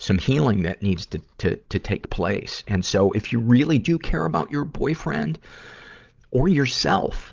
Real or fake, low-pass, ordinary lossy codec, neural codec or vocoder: fake; 7.2 kHz; Opus, 32 kbps; codec, 16 kHz, 16 kbps, FreqCodec, larger model